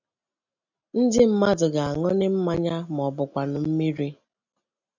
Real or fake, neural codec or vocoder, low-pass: real; none; 7.2 kHz